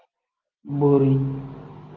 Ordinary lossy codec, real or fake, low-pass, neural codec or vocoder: Opus, 32 kbps; real; 7.2 kHz; none